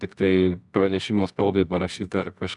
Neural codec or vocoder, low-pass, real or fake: codec, 24 kHz, 0.9 kbps, WavTokenizer, medium music audio release; 10.8 kHz; fake